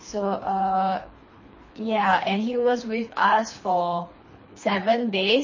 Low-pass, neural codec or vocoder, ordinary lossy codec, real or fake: 7.2 kHz; codec, 24 kHz, 3 kbps, HILCodec; MP3, 32 kbps; fake